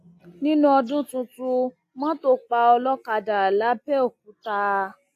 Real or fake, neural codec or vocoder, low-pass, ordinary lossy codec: real; none; 14.4 kHz; AAC, 64 kbps